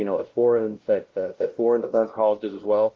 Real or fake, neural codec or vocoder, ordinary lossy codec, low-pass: fake; codec, 16 kHz, 0.5 kbps, X-Codec, WavLM features, trained on Multilingual LibriSpeech; Opus, 16 kbps; 7.2 kHz